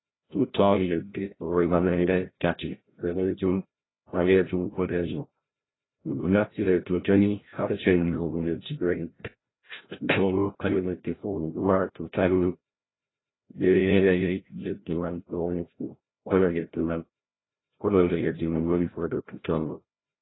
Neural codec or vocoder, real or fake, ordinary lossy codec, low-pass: codec, 16 kHz, 0.5 kbps, FreqCodec, larger model; fake; AAC, 16 kbps; 7.2 kHz